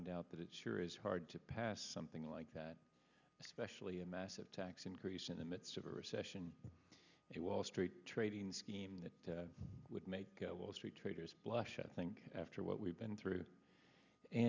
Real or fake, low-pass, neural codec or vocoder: real; 7.2 kHz; none